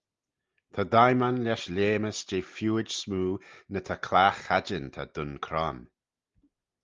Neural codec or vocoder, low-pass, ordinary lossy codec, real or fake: none; 7.2 kHz; Opus, 32 kbps; real